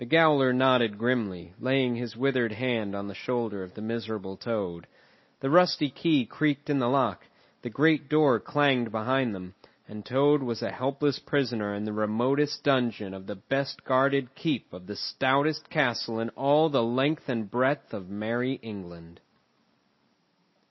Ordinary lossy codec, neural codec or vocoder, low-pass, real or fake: MP3, 24 kbps; none; 7.2 kHz; real